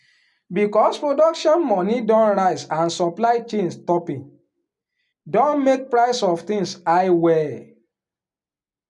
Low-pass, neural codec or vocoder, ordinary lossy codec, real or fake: 10.8 kHz; none; none; real